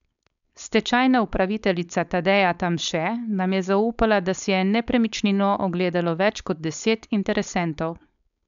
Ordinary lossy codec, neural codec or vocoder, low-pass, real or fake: none; codec, 16 kHz, 4.8 kbps, FACodec; 7.2 kHz; fake